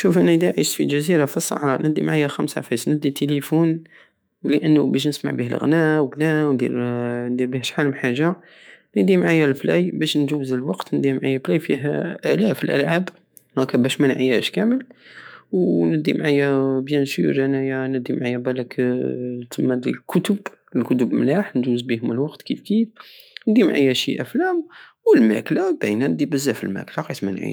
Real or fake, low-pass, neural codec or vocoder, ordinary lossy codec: fake; none; autoencoder, 48 kHz, 128 numbers a frame, DAC-VAE, trained on Japanese speech; none